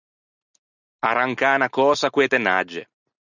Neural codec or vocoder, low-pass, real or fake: none; 7.2 kHz; real